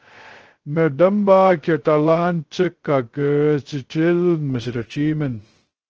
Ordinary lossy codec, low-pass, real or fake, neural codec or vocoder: Opus, 16 kbps; 7.2 kHz; fake; codec, 16 kHz, 0.2 kbps, FocalCodec